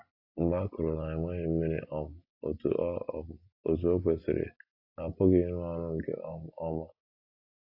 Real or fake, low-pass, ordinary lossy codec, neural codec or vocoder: real; 5.4 kHz; AAC, 32 kbps; none